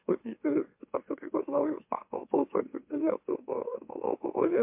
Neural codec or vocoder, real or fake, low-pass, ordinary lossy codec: autoencoder, 44.1 kHz, a latent of 192 numbers a frame, MeloTTS; fake; 3.6 kHz; MP3, 32 kbps